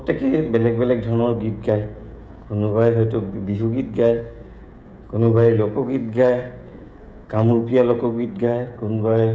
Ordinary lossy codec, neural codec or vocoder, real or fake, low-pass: none; codec, 16 kHz, 16 kbps, FreqCodec, smaller model; fake; none